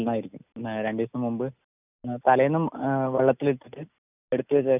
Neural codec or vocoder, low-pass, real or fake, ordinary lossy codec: none; 3.6 kHz; real; none